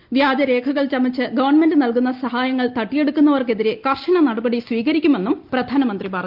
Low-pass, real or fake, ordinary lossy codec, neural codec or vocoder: 5.4 kHz; real; Opus, 32 kbps; none